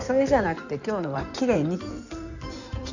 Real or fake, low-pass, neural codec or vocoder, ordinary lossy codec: fake; 7.2 kHz; codec, 16 kHz in and 24 kHz out, 2.2 kbps, FireRedTTS-2 codec; none